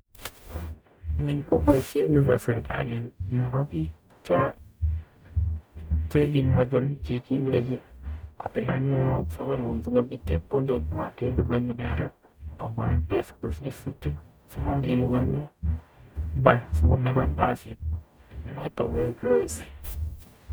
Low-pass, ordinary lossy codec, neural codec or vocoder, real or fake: none; none; codec, 44.1 kHz, 0.9 kbps, DAC; fake